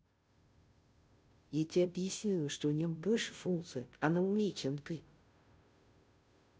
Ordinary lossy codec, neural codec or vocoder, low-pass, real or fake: none; codec, 16 kHz, 0.5 kbps, FunCodec, trained on Chinese and English, 25 frames a second; none; fake